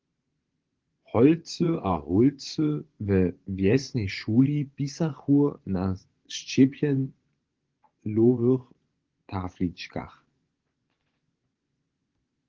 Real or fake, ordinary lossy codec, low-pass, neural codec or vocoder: fake; Opus, 16 kbps; 7.2 kHz; vocoder, 24 kHz, 100 mel bands, Vocos